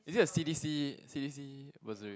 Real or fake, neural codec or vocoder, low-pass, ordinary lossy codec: real; none; none; none